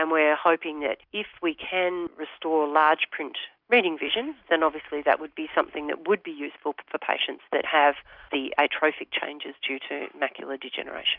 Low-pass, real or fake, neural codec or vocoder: 5.4 kHz; real; none